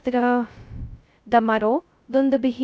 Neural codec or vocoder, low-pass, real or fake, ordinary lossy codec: codec, 16 kHz, 0.2 kbps, FocalCodec; none; fake; none